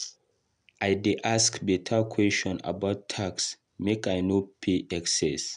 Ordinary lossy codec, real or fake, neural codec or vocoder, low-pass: none; real; none; 10.8 kHz